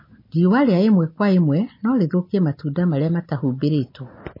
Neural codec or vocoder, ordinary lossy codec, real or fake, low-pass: none; MP3, 24 kbps; real; 5.4 kHz